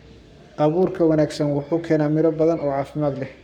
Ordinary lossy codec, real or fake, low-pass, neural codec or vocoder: none; fake; 19.8 kHz; codec, 44.1 kHz, 7.8 kbps, Pupu-Codec